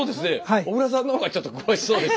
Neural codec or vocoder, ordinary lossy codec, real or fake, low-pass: none; none; real; none